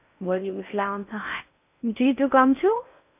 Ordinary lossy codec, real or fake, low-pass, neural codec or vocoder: none; fake; 3.6 kHz; codec, 16 kHz in and 24 kHz out, 0.6 kbps, FocalCodec, streaming, 4096 codes